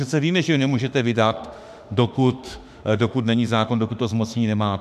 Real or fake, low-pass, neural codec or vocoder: fake; 14.4 kHz; autoencoder, 48 kHz, 32 numbers a frame, DAC-VAE, trained on Japanese speech